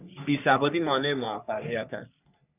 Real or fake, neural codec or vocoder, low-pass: fake; codec, 44.1 kHz, 3.4 kbps, Pupu-Codec; 3.6 kHz